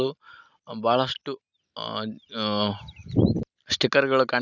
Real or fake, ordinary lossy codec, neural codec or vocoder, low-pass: real; none; none; 7.2 kHz